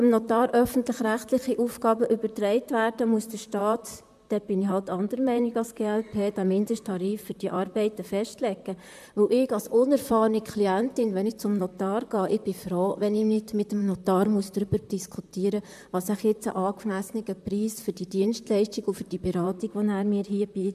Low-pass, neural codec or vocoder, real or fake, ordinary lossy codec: 14.4 kHz; vocoder, 44.1 kHz, 128 mel bands, Pupu-Vocoder; fake; MP3, 96 kbps